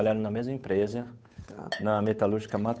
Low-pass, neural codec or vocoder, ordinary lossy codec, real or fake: none; codec, 16 kHz, 8 kbps, FunCodec, trained on Chinese and English, 25 frames a second; none; fake